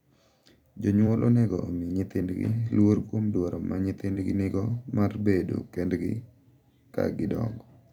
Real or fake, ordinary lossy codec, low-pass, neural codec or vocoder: fake; none; 19.8 kHz; vocoder, 44.1 kHz, 128 mel bands every 256 samples, BigVGAN v2